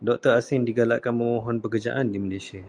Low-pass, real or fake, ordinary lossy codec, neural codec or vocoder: 9.9 kHz; real; Opus, 32 kbps; none